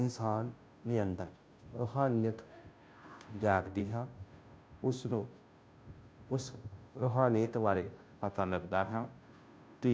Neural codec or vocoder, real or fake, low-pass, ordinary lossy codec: codec, 16 kHz, 0.5 kbps, FunCodec, trained on Chinese and English, 25 frames a second; fake; none; none